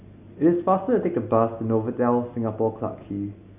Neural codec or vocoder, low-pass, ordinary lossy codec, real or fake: none; 3.6 kHz; none; real